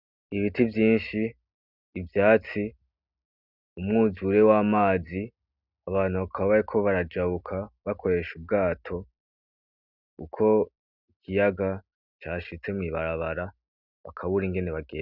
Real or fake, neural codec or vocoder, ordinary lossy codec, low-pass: real; none; Opus, 64 kbps; 5.4 kHz